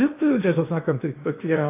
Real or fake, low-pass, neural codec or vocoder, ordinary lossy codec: fake; 3.6 kHz; codec, 16 kHz in and 24 kHz out, 0.8 kbps, FocalCodec, streaming, 65536 codes; AAC, 24 kbps